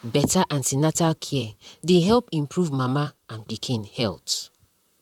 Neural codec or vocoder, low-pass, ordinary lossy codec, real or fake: vocoder, 44.1 kHz, 128 mel bands, Pupu-Vocoder; 19.8 kHz; none; fake